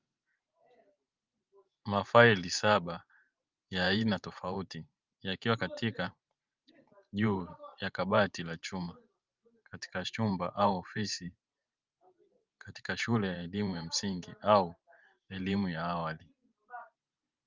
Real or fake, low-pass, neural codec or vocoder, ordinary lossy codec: fake; 7.2 kHz; vocoder, 44.1 kHz, 128 mel bands every 512 samples, BigVGAN v2; Opus, 24 kbps